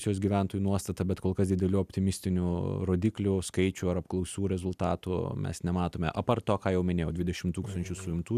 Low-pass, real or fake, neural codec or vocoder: 14.4 kHz; real; none